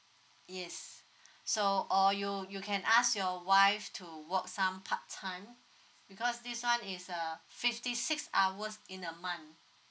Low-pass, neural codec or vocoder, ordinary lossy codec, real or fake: none; none; none; real